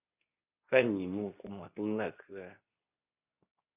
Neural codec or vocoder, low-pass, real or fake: codec, 24 kHz, 0.9 kbps, WavTokenizer, medium speech release version 2; 3.6 kHz; fake